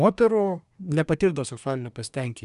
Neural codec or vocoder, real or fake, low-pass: codec, 24 kHz, 1 kbps, SNAC; fake; 10.8 kHz